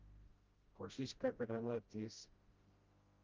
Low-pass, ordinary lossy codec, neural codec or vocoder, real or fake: 7.2 kHz; Opus, 32 kbps; codec, 16 kHz, 0.5 kbps, FreqCodec, smaller model; fake